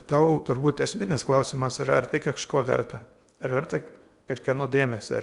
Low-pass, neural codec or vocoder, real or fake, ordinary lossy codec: 10.8 kHz; codec, 16 kHz in and 24 kHz out, 0.8 kbps, FocalCodec, streaming, 65536 codes; fake; Opus, 64 kbps